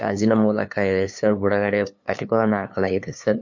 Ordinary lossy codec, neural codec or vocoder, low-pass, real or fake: MP3, 48 kbps; codec, 16 kHz, 2 kbps, FunCodec, trained on LibriTTS, 25 frames a second; 7.2 kHz; fake